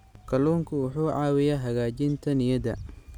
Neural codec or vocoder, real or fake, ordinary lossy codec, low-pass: none; real; none; 19.8 kHz